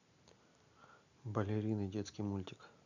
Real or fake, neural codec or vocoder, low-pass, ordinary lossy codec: real; none; 7.2 kHz; none